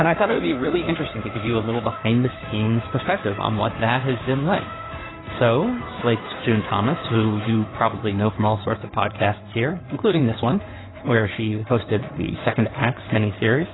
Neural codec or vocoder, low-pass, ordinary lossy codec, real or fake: codec, 16 kHz in and 24 kHz out, 2.2 kbps, FireRedTTS-2 codec; 7.2 kHz; AAC, 16 kbps; fake